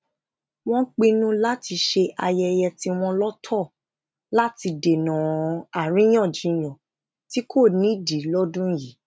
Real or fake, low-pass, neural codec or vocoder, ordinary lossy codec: real; none; none; none